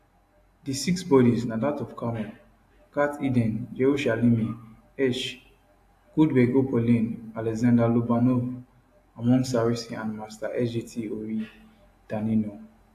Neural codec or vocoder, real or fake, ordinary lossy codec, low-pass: none; real; AAC, 64 kbps; 14.4 kHz